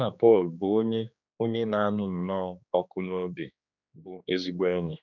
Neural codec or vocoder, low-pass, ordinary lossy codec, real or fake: codec, 16 kHz, 2 kbps, X-Codec, HuBERT features, trained on general audio; 7.2 kHz; none; fake